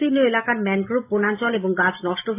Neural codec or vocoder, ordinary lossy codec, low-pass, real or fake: none; AAC, 24 kbps; 3.6 kHz; real